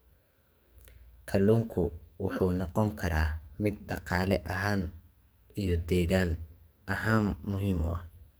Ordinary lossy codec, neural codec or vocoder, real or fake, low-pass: none; codec, 44.1 kHz, 2.6 kbps, SNAC; fake; none